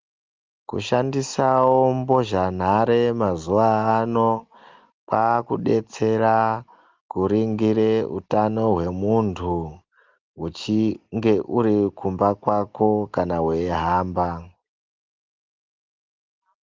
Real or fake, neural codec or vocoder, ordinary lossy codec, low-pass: real; none; Opus, 32 kbps; 7.2 kHz